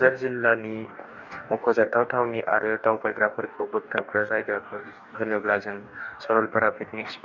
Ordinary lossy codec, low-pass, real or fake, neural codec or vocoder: none; 7.2 kHz; fake; codec, 44.1 kHz, 2.6 kbps, DAC